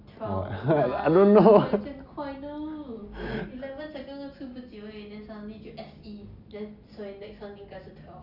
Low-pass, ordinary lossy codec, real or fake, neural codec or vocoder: 5.4 kHz; none; real; none